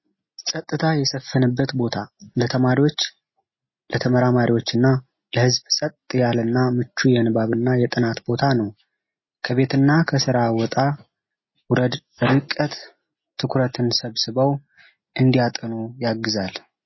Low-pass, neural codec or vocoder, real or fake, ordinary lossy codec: 7.2 kHz; none; real; MP3, 24 kbps